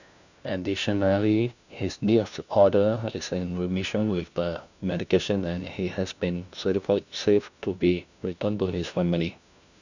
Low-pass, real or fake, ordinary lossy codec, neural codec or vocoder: 7.2 kHz; fake; none; codec, 16 kHz, 1 kbps, FunCodec, trained on LibriTTS, 50 frames a second